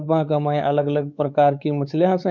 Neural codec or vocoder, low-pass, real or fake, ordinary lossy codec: codec, 16 kHz, 4 kbps, X-Codec, WavLM features, trained on Multilingual LibriSpeech; 7.2 kHz; fake; none